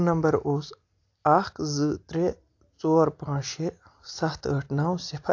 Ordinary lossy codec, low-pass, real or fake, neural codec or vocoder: AAC, 48 kbps; 7.2 kHz; real; none